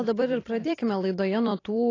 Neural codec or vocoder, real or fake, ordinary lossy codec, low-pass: vocoder, 44.1 kHz, 128 mel bands every 256 samples, BigVGAN v2; fake; AAC, 32 kbps; 7.2 kHz